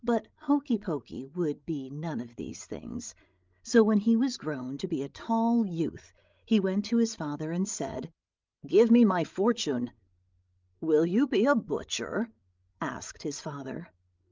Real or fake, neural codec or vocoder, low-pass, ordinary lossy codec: fake; codec, 16 kHz, 16 kbps, FreqCodec, larger model; 7.2 kHz; Opus, 24 kbps